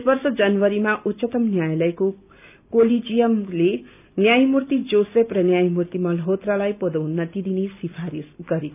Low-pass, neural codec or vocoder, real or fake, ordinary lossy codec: 3.6 kHz; none; real; none